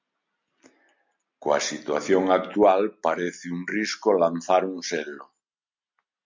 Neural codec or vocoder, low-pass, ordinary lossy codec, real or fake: none; 7.2 kHz; MP3, 64 kbps; real